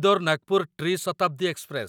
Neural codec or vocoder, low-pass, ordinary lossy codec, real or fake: none; 19.8 kHz; none; real